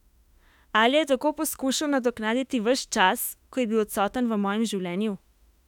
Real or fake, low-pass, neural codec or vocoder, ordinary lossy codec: fake; 19.8 kHz; autoencoder, 48 kHz, 32 numbers a frame, DAC-VAE, trained on Japanese speech; none